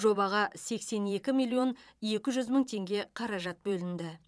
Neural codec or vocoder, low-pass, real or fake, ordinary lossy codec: vocoder, 22.05 kHz, 80 mel bands, Vocos; none; fake; none